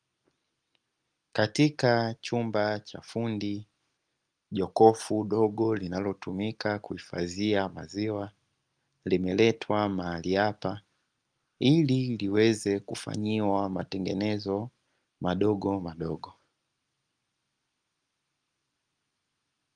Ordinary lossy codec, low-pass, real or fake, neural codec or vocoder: Opus, 32 kbps; 9.9 kHz; real; none